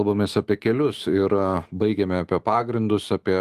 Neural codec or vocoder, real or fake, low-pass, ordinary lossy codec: autoencoder, 48 kHz, 128 numbers a frame, DAC-VAE, trained on Japanese speech; fake; 14.4 kHz; Opus, 24 kbps